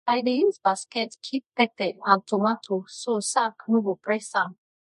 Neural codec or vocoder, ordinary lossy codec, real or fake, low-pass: codec, 24 kHz, 0.9 kbps, WavTokenizer, medium music audio release; MP3, 48 kbps; fake; 10.8 kHz